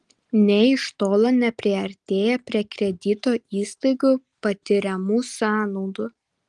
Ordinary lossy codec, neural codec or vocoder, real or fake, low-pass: Opus, 24 kbps; none; real; 10.8 kHz